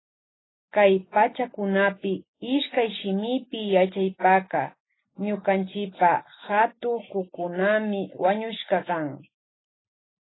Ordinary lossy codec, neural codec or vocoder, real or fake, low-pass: AAC, 16 kbps; none; real; 7.2 kHz